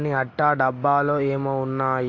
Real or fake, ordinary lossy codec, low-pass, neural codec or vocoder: real; AAC, 32 kbps; 7.2 kHz; none